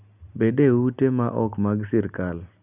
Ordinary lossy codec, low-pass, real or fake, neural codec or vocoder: none; 3.6 kHz; real; none